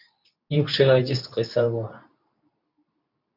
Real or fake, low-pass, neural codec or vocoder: fake; 5.4 kHz; codec, 24 kHz, 0.9 kbps, WavTokenizer, medium speech release version 2